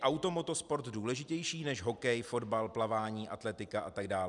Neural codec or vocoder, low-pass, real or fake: none; 10.8 kHz; real